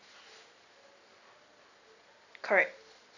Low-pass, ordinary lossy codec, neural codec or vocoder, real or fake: 7.2 kHz; none; none; real